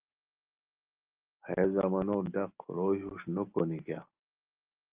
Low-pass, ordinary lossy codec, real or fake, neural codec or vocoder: 3.6 kHz; Opus, 32 kbps; real; none